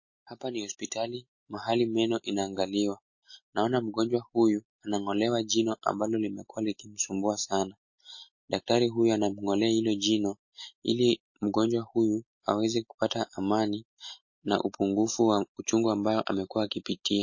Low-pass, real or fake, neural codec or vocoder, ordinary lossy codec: 7.2 kHz; real; none; MP3, 32 kbps